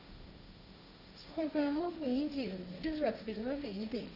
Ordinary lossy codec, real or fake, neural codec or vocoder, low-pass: none; fake; codec, 16 kHz, 1.1 kbps, Voila-Tokenizer; 5.4 kHz